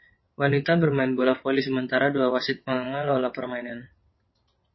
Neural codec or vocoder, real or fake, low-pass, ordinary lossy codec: vocoder, 22.05 kHz, 80 mel bands, WaveNeXt; fake; 7.2 kHz; MP3, 24 kbps